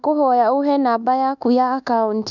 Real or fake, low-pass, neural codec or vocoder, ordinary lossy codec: fake; 7.2 kHz; codec, 24 kHz, 1.2 kbps, DualCodec; none